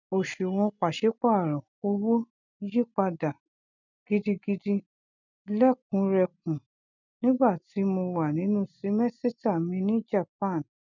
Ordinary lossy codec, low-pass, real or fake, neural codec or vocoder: none; 7.2 kHz; real; none